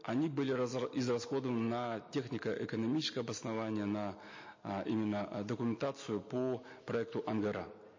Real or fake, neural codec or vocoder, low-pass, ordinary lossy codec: real; none; 7.2 kHz; MP3, 32 kbps